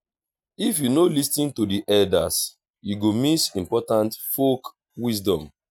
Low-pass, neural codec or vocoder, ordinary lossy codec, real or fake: none; none; none; real